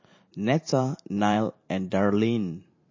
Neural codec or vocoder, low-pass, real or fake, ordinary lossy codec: vocoder, 44.1 kHz, 128 mel bands every 512 samples, BigVGAN v2; 7.2 kHz; fake; MP3, 32 kbps